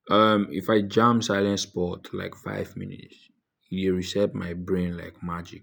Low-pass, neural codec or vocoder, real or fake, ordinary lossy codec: none; none; real; none